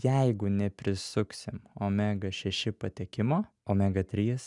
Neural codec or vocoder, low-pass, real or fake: none; 10.8 kHz; real